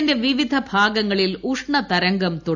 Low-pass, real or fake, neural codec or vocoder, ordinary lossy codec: 7.2 kHz; real; none; none